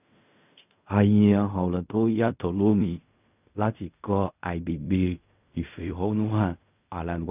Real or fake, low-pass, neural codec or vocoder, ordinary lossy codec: fake; 3.6 kHz; codec, 16 kHz in and 24 kHz out, 0.4 kbps, LongCat-Audio-Codec, fine tuned four codebook decoder; none